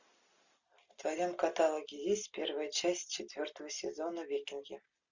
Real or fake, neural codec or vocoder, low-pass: real; none; 7.2 kHz